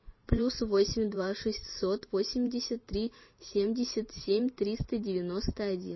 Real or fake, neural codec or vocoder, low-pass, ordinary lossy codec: fake; vocoder, 44.1 kHz, 128 mel bands every 512 samples, BigVGAN v2; 7.2 kHz; MP3, 24 kbps